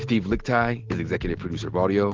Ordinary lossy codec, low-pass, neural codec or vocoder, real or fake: Opus, 16 kbps; 7.2 kHz; none; real